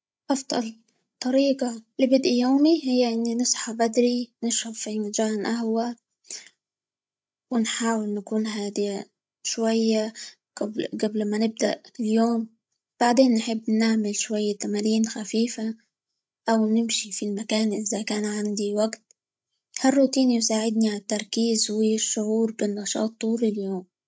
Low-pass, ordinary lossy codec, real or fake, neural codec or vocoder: none; none; fake; codec, 16 kHz, 16 kbps, FreqCodec, larger model